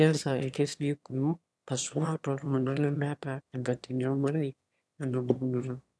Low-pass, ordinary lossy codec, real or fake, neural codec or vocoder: none; none; fake; autoencoder, 22.05 kHz, a latent of 192 numbers a frame, VITS, trained on one speaker